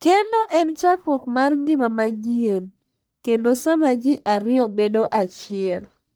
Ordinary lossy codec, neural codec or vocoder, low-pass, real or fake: none; codec, 44.1 kHz, 1.7 kbps, Pupu-Codec; none; fake